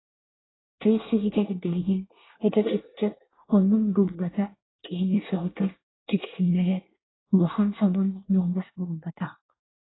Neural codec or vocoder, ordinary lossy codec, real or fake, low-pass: codec, 24 kHz, 1 kbps, SNAC; AAC, 16 kbps; fake; 7.2 kHz